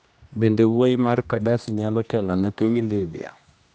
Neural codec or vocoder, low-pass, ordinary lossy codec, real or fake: codec, 16 kHz, 1 kbps, X-Codec, HuBERT features, trained on general audio; none; none; fake